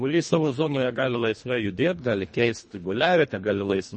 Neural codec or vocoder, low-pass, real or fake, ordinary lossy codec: codec, 24 kHz, 1.5 kbps, HILCodec; 10.8 kHz; fake; MP3, 32 kbps